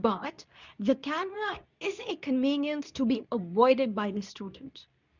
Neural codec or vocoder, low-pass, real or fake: codec, 24 kHz, 0.9 kbps, WavTokenizer, medium speech release version 2; 7.2 kHz; fake